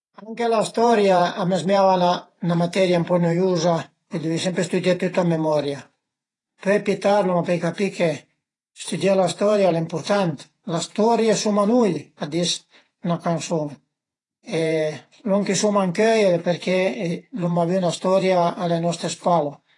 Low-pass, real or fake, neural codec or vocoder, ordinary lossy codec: 10.8 kHz; fake; vocoder, 48 kHz, 128 mel bands, Vocos; AAC, 32 kbps